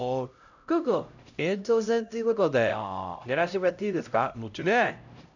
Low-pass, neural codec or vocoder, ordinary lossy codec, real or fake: 7.2 kHz; codec, 16 kHz, 0.5 kbps, X-Codec, HuBERT features, trained on LibriSpeech; none; fake